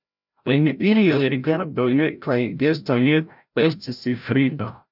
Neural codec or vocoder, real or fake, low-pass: codec, 16 kHz, 0.5 kbps, FreqCodec, larger model; fake; 5.4 kHz